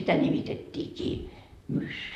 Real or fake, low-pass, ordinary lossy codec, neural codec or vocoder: fake; 14.4 kHz; none; vocoder, 44.1 kHz, 128 mel bands, Pupu-Vocoder